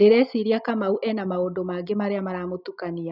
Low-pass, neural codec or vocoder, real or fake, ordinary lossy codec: 5.4 kHz; none; real; none